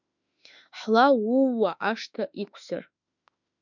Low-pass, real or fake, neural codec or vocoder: 7.2 kHz; fake; autoencoder, 48 kHz, 32 numbers a frame, DAC-VAE, trained on Japanese speech